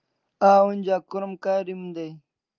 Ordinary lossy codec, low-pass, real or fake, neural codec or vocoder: Opus, 24 kbps; 7.2 kHz; real; none